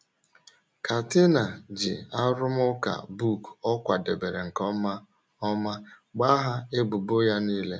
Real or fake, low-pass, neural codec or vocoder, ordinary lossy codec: real; none; none; none